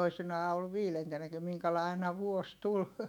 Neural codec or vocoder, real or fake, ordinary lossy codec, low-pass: autoencoder, 48 kHz, 128 numbers a frame, DAC-VAE, trained on Japanese speech; fake; none; 19.8 kHz